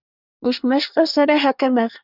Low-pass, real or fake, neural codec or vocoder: 5.4 kHz; fake; codec, 24 kHz, 1 kbps, SNAC